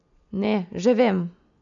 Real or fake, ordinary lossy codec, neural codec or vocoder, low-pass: real; none; none; 7.2 kHz